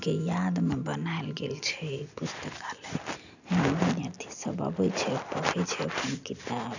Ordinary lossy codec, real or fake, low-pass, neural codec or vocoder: none; real; 7.2 kHz; none